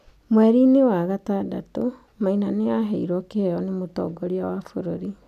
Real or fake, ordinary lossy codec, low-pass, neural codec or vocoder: real; none; 14.4 kHz; none